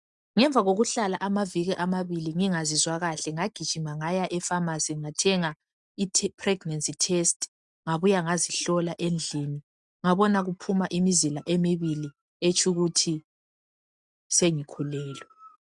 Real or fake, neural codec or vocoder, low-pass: real; none; 10.8 kHz